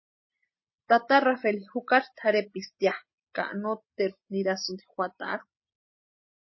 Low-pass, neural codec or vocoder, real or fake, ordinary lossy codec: 7.2 kHz; none; real; MP3, 24 kbps